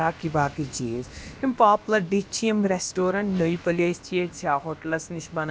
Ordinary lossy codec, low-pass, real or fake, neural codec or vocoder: none; none; fake; codec, 16 kHz, about 1 kbps, DyCAST, with the encoder's durations